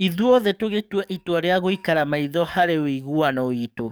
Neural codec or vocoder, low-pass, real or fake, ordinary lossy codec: codec, 44.1 kHz, 7.8 kbps, DAC; none; fake; none